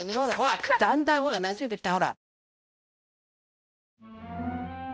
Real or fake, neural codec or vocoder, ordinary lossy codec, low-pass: fake; codec, 16 kHz, 0.5 kbps, X-Codec, HuBERT features, trained on balanced general audio; none; none